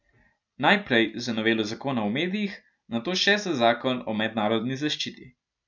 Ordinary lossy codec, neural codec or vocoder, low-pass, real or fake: none; none; 7.2 kHz; real